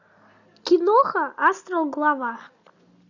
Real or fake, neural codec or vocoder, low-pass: real; none; 7.2 kHz